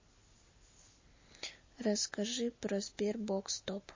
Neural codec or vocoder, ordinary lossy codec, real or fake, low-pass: none; MP3, 32 kbps; real; 7.2 kHz